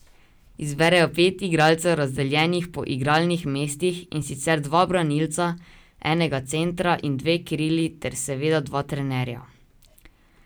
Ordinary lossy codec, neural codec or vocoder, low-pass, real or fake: none; none; none; real